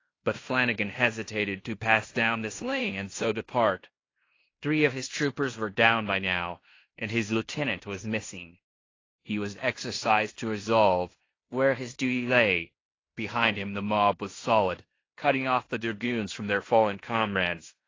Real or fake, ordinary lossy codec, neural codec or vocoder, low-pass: fake; AAC, 32 kbps; codec, 16 kHz in and 24 kHz out, 0.9 kbps, LongCat-Audio-Codec, fine tuned four codebook decoder; 7.2 kHz